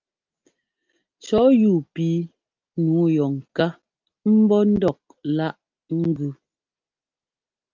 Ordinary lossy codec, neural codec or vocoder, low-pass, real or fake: Opus, 24 kbps; none; 7.2 kHz; real